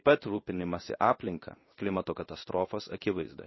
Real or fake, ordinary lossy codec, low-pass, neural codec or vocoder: fake; MP3, 24 kbps; 7.2 kHz; codec, 16 kHz in and 24 kHz out, 1 kbps, XY-Tokenizer